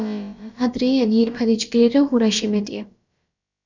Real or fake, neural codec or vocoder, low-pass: fake; codec, 16 kHz, about 1 kbps, DyCAST, with the encoder's durations; 7.2 kHz